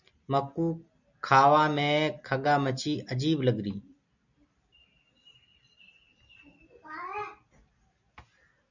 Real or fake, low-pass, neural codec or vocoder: real; 7.2 kHz; none